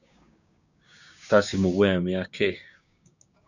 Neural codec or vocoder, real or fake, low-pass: codec, 16 kHz, 6 kbps, DAC; fake; 7.2 kHz